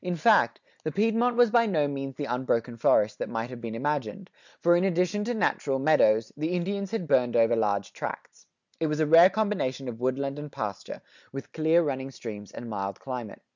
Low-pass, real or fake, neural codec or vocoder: 7.2 kHz; real; none